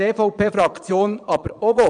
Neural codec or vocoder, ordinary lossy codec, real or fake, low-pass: vocoder, 22.05 kHz, 80 mel bands, Vocos; none; fake; 9.9 kHz